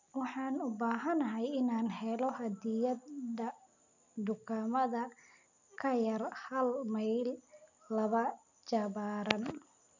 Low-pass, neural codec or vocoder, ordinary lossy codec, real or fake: 7.2 kHz; none; none; real